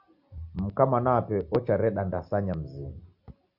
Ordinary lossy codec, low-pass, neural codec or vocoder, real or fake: Opus, 64 kbps; 5.4 kHz; none; real